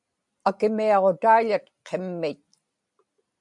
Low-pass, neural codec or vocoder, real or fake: 10.8 kHz; none; real